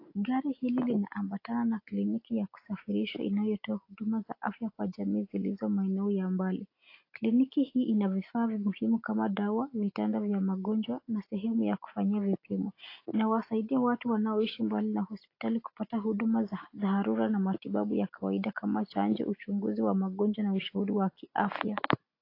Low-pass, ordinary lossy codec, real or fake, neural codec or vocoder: 5.4 kHz; AAC, 32 kbps; real; none